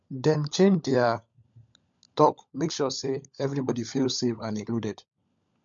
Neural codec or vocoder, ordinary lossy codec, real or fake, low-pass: codec, 16 kHz, 16 kbps, FunCodec, trained on LibriTTS, 50 frames a second; MP3, 48 kbps; fake; 7.2 kHz